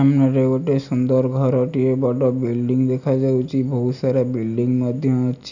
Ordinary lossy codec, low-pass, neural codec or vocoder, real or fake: none; 7.2 kHz; none; real